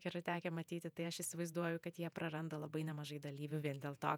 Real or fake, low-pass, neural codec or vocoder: fake; 19.8 kHz; vocoder, 48 kHz, 128 mel bands, Vocos